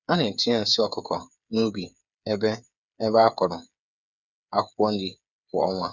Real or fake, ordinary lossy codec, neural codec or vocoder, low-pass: fake; none; codec, 16 kHz, 8 kbps, FreqCodec, smaller model; 7.2 kHz